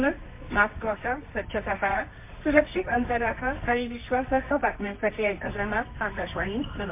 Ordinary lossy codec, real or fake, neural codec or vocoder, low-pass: MP3, 24 kbps; fake; codec, 24 kHz, 0.9 kbps, WavTokenizer, medium music audio release; 3.6 kHz